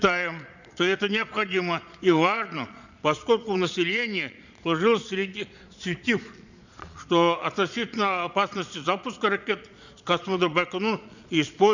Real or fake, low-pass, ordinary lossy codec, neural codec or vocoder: fake; 7.2 kHz; none; vocoder, 22.05 kHz, 80 mel bands, Vocos